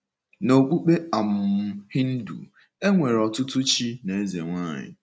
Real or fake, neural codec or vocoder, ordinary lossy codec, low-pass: real; none; none; none